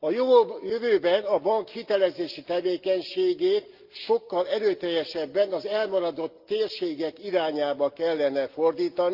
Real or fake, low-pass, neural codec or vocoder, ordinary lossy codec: real; 5.4 kHz; none; Opus, 24 kbps